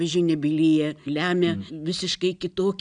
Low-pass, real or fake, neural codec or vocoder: 9.9 kHz; real; none